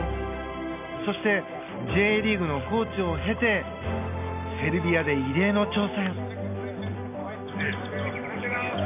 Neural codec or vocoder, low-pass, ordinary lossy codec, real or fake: none; 3.6 kHz; none; real